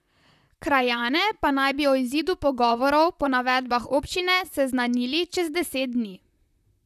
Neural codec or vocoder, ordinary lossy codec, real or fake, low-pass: vocoder, 44.1 kHz, 128 mel bands, Pupu-Vocoder; none; fake; 14.4 kHz